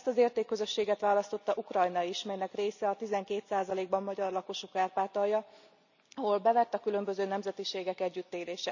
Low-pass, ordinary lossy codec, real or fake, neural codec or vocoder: 7.2 kHz; none; real; none